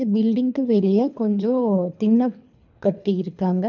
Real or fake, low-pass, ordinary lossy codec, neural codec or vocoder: fake; 7.2 kHz; none; codec, 24 kHz, 3 kbps, HILCodec